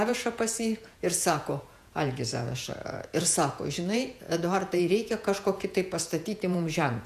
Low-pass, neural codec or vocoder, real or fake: 14.4 kHz; vocoder, 48 kHz, 128 mel bands, Vocos; fake